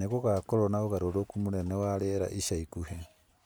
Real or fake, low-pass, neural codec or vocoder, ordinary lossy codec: real; none; none; none